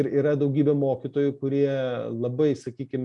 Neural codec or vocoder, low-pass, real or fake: none; 10.8 kHz; real